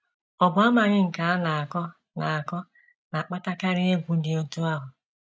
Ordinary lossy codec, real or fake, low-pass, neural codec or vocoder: none; real; none; none